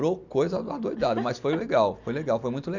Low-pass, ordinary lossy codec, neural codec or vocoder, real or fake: 7.2 kHz; none; none; real